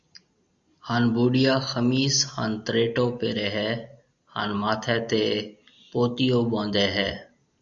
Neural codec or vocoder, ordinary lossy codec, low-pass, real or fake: none; Opus, 64 kbps; 7.2 kHz; real